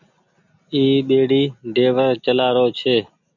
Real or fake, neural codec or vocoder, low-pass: real; none; 7.2 kHz